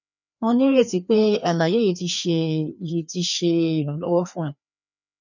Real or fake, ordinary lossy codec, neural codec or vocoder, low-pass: fake; none; codec, 16 kHz, 2 kbps, FreqCodec, larger model; 7.2 kHz